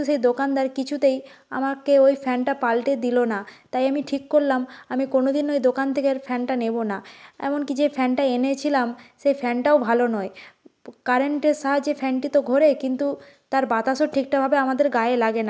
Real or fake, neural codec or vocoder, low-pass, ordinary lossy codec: real; none; none; none